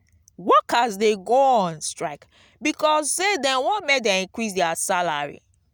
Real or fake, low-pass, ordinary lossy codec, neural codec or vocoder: real; none; none; none